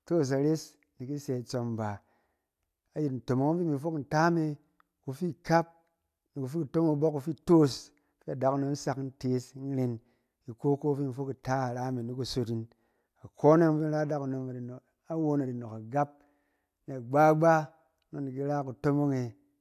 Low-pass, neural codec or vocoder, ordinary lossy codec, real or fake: 14.4 kHz; none; AAC, 96 kbps; real